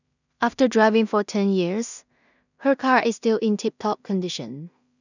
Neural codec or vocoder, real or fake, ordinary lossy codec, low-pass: codec, 16 kHz in and 24 kHz out, 0.4 kbps, LongCat-Audio-Codec, two codebook decoder; fake; none; 7.2 kHz